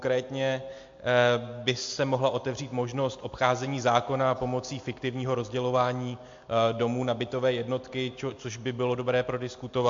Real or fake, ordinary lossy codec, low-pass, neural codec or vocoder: real; MP3, 48 kbps; 7.2 kHz; none